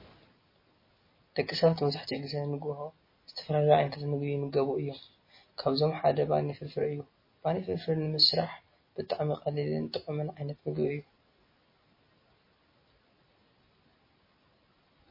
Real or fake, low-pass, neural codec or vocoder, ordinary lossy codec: real; 5.4 kHz; none; MP3, 24 kbps